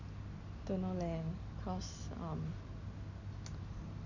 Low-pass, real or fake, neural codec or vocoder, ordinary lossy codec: 7.2 kHz; real; none; none